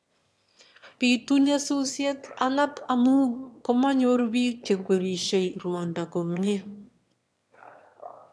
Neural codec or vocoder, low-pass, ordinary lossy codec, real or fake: autoencoder, 22.05 kHz, a latent of 192 numbers a frame, VITS, trained on one speaker; none; none; fake